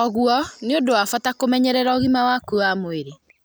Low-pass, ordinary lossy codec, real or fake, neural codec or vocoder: none; none; real; none